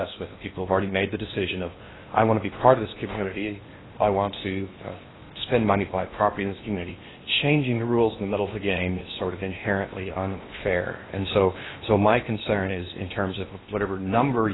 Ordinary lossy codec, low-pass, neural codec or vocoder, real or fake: AAC, 16 kbps; 7.2 kHz; codec, 16 kHz in and 24 kHz out, 0.8 kbps, FocalCodec, streaming, 65536 codes; fake